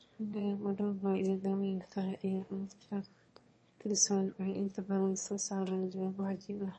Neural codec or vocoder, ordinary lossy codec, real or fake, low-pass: autoencoder, 22.05 kHz, a latent of 192 numbers a frame, VITS, trained on one speaker; MP3, 32 kbps; fake; 9.9 kHz